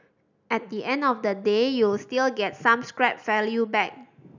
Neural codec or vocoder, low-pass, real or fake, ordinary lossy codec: none; 7.2 kHz; real; none